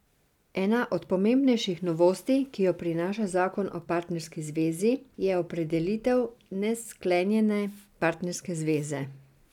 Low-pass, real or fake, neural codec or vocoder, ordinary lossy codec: 19.8 kHz; real; none; none